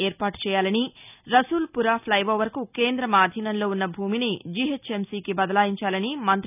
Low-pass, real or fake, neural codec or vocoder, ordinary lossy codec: 3.6 kHz; real; none; none